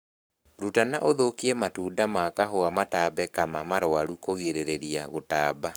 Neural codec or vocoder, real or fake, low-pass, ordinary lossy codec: codec, 44.1 kHz, 7.8 kbps, Pupu-Codec; fake; none; none